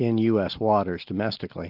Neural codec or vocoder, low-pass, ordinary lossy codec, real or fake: none; 5.4 kHz; Opus, 32 kbps; real